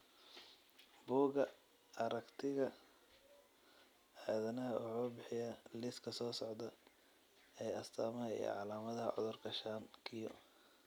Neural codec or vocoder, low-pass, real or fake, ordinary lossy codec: none; none; real; none